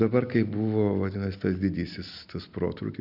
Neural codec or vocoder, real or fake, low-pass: none; real; 5.4 kHz